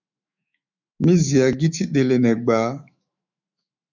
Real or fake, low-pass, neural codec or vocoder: fake; 7.2 kHz; autoencoder, 48 kHz, 128 numbers a frame, DAC-VAE, trained on Japanese speech